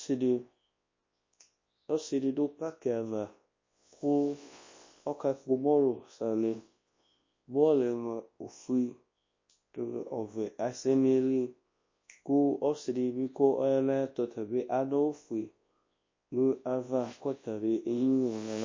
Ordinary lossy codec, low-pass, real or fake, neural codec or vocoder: MP3, 32 kbps; 7.2 kHz; fake; codec, 24 kHz, 0.9 kbps, WavTokenizer, large speech release